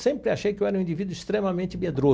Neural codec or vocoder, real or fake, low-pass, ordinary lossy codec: none; real; none; none